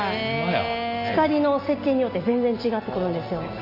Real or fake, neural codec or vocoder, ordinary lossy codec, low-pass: real; none; AAC, 48 kbps; 5.4 kHz